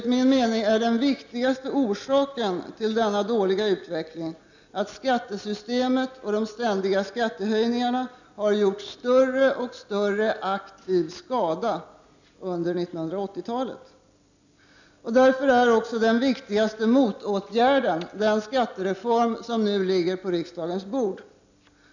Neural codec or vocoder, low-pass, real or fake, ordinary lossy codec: none; 7.2 kHz; real; none